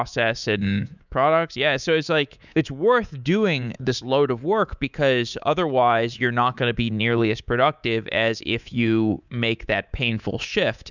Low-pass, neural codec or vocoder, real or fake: 7.2 kHz; codec, 24 kHz, 3.1 kbps, DualCodec; fake